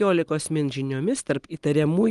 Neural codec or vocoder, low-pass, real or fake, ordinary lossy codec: none; 10.8 kHz; real; Opus, 24 kbps